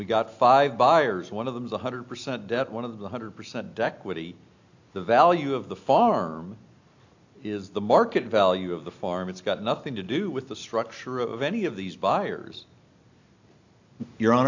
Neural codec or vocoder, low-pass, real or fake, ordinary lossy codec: none; 7.2 kHz; real; AAC, 48 kbps